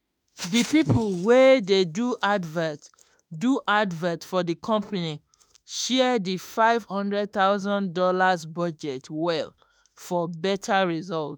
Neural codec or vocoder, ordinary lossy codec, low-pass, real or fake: autoencoder, 48 kHz, 32 numbers a frame, DAC-VAE, trained on Japanese speech; none; none; fake